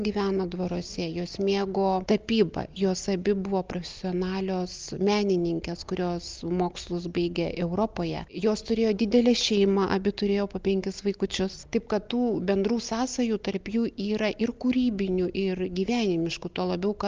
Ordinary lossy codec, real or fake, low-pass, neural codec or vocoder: Opus, 24 kbps; real; 7.2 kHz; none